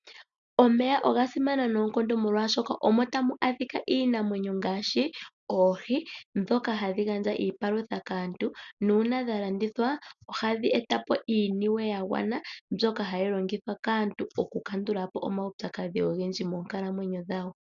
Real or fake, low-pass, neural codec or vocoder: real; 7.2 kHz; none